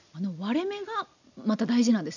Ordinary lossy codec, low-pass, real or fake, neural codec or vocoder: none; 7.2 kHz; real; none